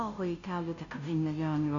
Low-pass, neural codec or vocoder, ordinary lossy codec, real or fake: 7.2 kHz; codec, 16 kHz, 0.5 kbps, FunCodec, trained on Chinese and English, 25 frames a second; MP3, 96 kbps; fake